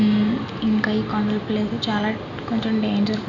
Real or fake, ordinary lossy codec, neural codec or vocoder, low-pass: real; none; none; 7.2 kHz